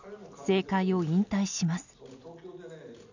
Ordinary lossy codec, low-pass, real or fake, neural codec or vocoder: none; 7.2 kHz; real; none